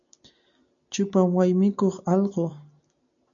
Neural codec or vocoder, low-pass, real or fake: none; 7.2 kHz; real